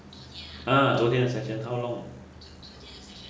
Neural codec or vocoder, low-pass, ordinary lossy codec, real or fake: none; none; none; real